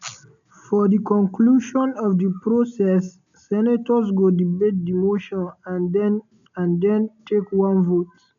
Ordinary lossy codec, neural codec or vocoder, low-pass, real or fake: none; none; 7.2 kHz; real